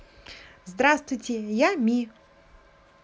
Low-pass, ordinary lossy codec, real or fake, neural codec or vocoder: none; none; real; none